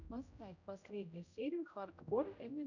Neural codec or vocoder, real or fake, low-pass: codec, 16 kHz, 0.5 kbps, X-Codec, HuBERT features, trained on general audio; fake; 7.2 kHz